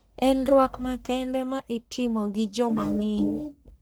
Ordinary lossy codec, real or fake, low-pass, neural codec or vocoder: none; fake; none; codec, 44.1 kHz, 1.7 kbps, Pupu-Codec